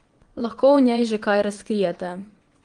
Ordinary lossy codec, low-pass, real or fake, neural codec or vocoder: Opus, 24 kbps; 9.9 kHz; fake; vocoder, 22.05 kHz, 80 mel bands, WaveNeXt